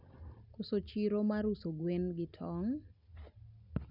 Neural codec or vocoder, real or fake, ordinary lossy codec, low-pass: none; real; none; 5.4 kHz